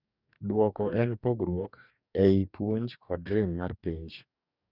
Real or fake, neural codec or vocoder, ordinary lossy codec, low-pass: fake; codec, 44.1 kHz, 2.6 kbps, DAC; none; 5.4 kHz